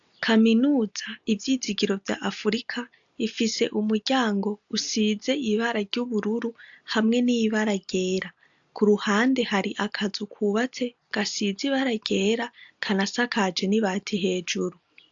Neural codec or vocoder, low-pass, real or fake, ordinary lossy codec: none; 7.2 kHz; real; AAC, 64 kbps